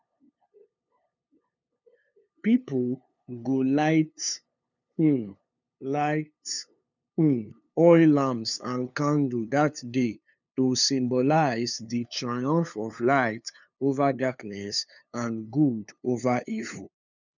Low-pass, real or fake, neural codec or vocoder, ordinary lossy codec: 7.2 kHz; fake; codec, 16 kHz, 2 kbps, FunCodec, trained on LibriTTS, 25 frames a second; none